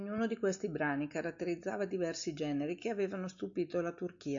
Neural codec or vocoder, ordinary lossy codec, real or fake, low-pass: none; AAC, 64 kbps; real; 7.2 kHz